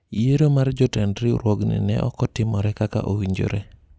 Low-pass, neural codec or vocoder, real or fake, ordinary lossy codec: none; none; real; none